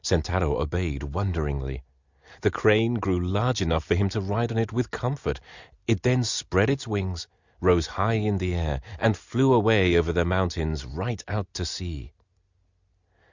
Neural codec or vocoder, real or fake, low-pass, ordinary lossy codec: none; real; 7.2 kHz; Opus, 64 kbps